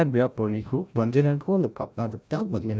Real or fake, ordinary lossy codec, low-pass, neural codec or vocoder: fake; none; none; codec, 16 kHz, 0.5 kbps, FreqCodec, larger model